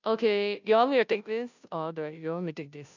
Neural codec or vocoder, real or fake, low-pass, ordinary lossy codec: codec, 16 kHz, 0.5 kbps, FunCodec, trained on Chinese and English, 25 frames a second; fake; 7.2 kHz; none